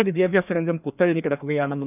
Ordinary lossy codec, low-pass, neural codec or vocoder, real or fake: none; 3.6 kHz; codec, 16 kHz, 2 kbps, FreqCodec, larger model; fake